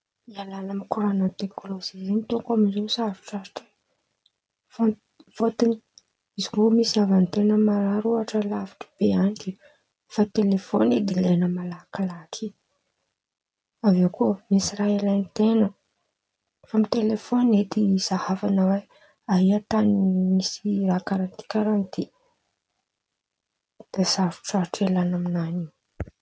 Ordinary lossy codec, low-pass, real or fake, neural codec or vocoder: none; none; real; none